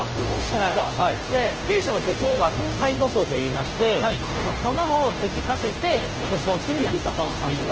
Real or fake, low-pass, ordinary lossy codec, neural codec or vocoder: fake; 7.2 kHz; Opus, 16 kbps; codec, 16 kHz, 0.5 kbps, FunCodec, trained on Chinese and English, 25 frames a second